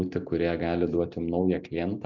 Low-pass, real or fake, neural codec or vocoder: 7.2 kHz; real; none